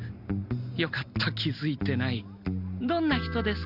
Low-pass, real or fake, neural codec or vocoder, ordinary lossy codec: 5.4 kHz; real; none; none